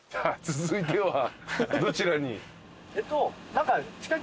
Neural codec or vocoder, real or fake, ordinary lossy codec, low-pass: none; real; none; none